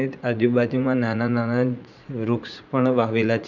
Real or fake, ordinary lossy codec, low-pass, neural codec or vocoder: fake; none; 7.2 kHz; vocoder, 44.1 kHz, 80 mel bands, Vocos